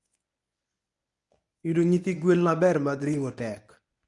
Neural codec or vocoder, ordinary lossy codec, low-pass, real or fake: codec, 24 kHz, 0.9 kbps, WavTokenizer, medium speech release version 1; none; 10.8 kHz; fake